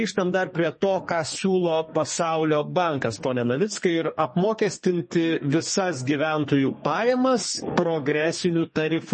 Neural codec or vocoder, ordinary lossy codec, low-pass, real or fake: codec, 32 kHz, 1.9 kbps, SNAC; MP3, 32 kbps; 10.8 kHz; fake